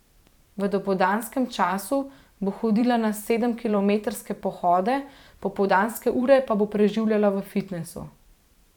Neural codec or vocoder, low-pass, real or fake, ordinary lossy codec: vocoder, 44.1 kHz, 128 mel bands every 512 samples, BigVGAN v2; 19.8 kHz; fake; none